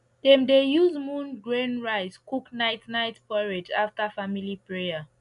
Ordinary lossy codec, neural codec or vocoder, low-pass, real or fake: none; none; 10.8 kHz; real